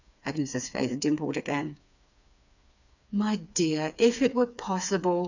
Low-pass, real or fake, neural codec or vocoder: 7.2 kHz; fake; codec, 16 kHz, 4 kbps, FreqCodec, smaller model